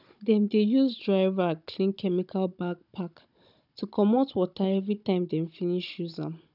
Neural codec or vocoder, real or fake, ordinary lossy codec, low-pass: codec, 16 kHz, 16 kbps, FunCodec, trained on Chinese and English, 50 frames a second; fake; none; 5.4 kHz